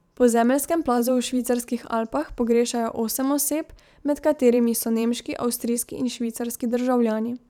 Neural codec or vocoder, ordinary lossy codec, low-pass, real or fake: vocoder, 44.1 kHz, 128 mel bands every 512 samples, BigVGAN v2; none; 19.8 kHz; fake